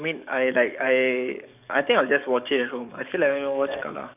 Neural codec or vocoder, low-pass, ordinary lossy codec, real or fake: codec, 44.1 kHz, 7.8 kbps, DAC; 3.6 kHz; none; fake